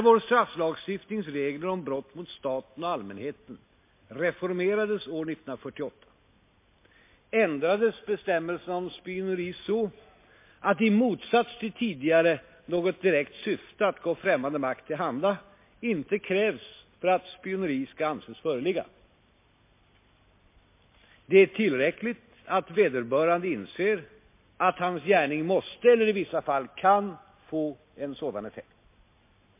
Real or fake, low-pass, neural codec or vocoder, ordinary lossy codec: real; 3.6 kHz; none; MP3, 24 kbps